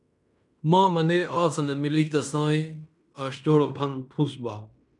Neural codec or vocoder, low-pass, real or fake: codec, 16 kHz in and 24 kHz out, 0.9 kbps, LongCat-Audio-Codec, fine tuned four codebook decoder; 10.8 kHz; fake